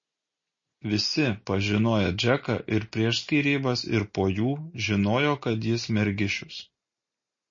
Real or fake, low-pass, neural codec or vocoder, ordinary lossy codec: real; 7.2 kHz; none; MP3, 32 kbps